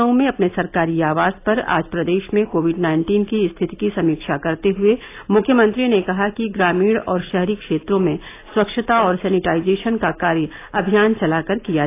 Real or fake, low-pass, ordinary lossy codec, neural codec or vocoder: real; 3.6 kHz; AAC, 24 kbps; none